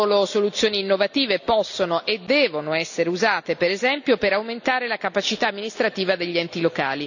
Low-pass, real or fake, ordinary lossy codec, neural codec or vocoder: 7.2 kHz; real; none; none